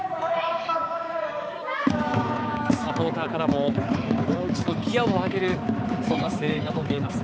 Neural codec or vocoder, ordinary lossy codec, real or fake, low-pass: codec, 16 kHz, 4 kbps, X-Codec, HuBERT features, trained on balanced general audio; none; fake; none